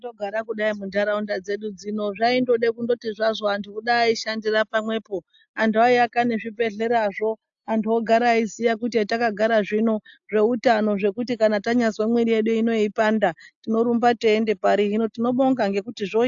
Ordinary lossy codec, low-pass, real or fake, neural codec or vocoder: AAC, 64 kbps; 7.2 kHz; real; none